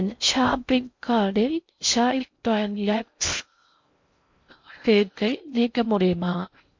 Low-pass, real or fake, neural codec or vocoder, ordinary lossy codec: 7.2 kHz; fake; codec, 16 kHz in and 24 kHz out, 0.6 kbps, FocalCodec, streaming, 4096 codes; MP3, 48 kbps